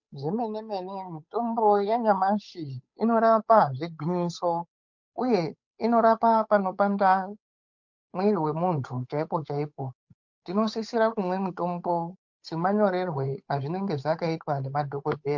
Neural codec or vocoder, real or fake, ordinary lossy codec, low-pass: codec, 16 kHz, 8 kbps, FunCodec, trained on Chinese and English, 25 frames a second; fake; MP3, 48 kbps; 7.2 kHz